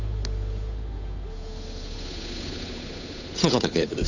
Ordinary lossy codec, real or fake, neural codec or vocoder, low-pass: none; fake; codec, 16 kHz, 8 kbps, FunCodec, trained on Chinese and English, 25 frames a second; 7.2 kHz